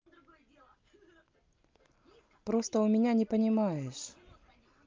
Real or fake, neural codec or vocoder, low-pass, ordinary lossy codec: real; none; 7.2 kHz; Opus, 32 kbps